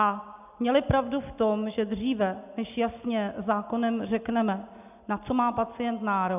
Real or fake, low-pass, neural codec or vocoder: real; 3.6 kHz; none